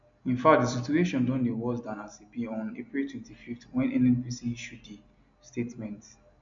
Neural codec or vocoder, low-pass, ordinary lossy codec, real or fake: none; 7.2 kHz; MP3, 64 kbps; real